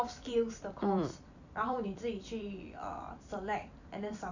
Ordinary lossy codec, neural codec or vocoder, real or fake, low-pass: none; vocoder, 44.1 kHz, 128 mel bands every 256 samples, BigVGAN v2; fake; 7.2 kHz